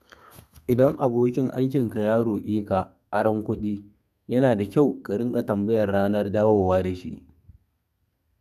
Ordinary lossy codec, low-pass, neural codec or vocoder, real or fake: none; 14.4 kHz; codec, 32 kHz, 1.9 kbps, SNAC; fake